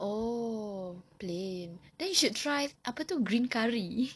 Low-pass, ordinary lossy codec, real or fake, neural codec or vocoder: none; none; real; none